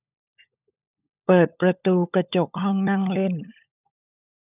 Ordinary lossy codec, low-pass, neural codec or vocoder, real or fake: none; 3.6 kHz; codec, 16 kHz, 16 kbps, FunCodec, trained on LibriTTS, 50 frames a second; fake